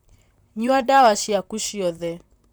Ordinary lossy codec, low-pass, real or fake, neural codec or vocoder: none; none; fake; vocoder, 44.1 kHz, 128 mel bands, Pupu-Vocoder